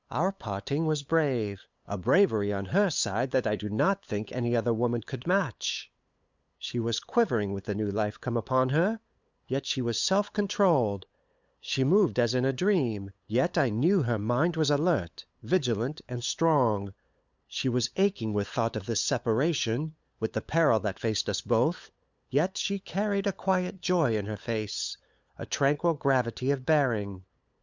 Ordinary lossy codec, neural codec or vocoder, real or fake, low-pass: Opus, 64 kbps; codec, 16 kHz, 8 kbps, FunCodec, trained on LibriTTS, 25 frames a second; fake; 7.2 kHz